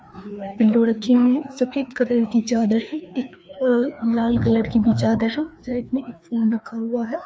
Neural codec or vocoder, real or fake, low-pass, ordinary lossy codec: codec, 16 kHz, 2 kbps, FreqCodec, larger model; fake; none; none